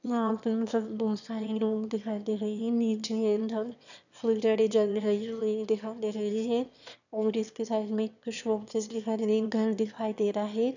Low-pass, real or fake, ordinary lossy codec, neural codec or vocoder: 7.2 kHz; fake; none; autoencoder, 22.05 kHz, a latent of 192 numbers a frame, VITS, trained on one speaker